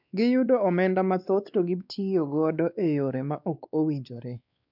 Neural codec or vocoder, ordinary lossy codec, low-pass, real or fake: codec, 16 kHz, 2 kbps, X-Codec, WavLM features, trained on Multilingual LibriSpeech; none; 5.4 kHz; fake